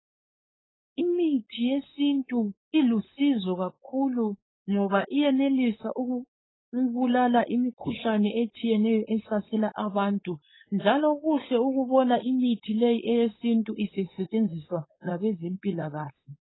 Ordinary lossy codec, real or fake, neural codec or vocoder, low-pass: AAC, 16 kbps; fake; codec, 16 kHz, 4.8 kbps, FACodec; 7.2 kHz